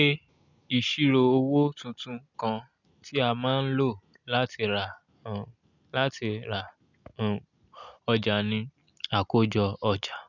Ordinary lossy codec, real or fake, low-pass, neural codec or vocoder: none; real; 7.2 kHz; none